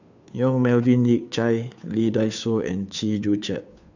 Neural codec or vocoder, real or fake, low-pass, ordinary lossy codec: codec, 16 kHz, 2 kbps, FunCodec, trained on Chinese and English, 25 frames a second; fake; 7.2 kHz; none